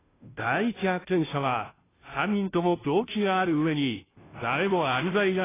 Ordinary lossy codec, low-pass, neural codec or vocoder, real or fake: AAC, 16 kbps; 3.6 kHz; codec, 16 kHz, 0.5 kbps, FunCodec, trained on Chinese and English, 25 frames a second; fake